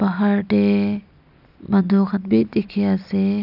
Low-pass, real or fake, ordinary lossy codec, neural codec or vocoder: 5.4 kHz; real; none; none